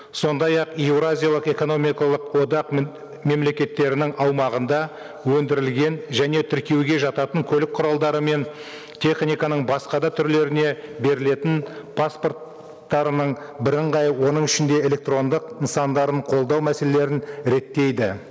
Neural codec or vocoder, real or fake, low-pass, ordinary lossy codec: none; real; none; none